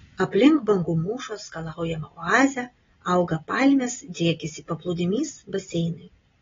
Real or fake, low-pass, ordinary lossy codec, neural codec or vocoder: real; 7.2 kHz; AAC, 24 kbps; none